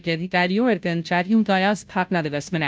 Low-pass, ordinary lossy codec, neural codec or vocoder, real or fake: none; none; codec, 16 kHz, 0.5 kbps, FunCodec, trained on Chinese and English, 25 frames a second; fake